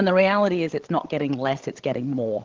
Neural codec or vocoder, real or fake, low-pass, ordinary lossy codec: codec, 16 kHz, 16 kbps, FreqCodec, larger model; fake; 7.2 kHz; Opus, 16 kbps